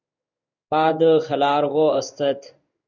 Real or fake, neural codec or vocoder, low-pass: fake; codec, 16 kHz in and 24 kHz out, 1 kbps, XY-Tokenizer; 7.2 kHz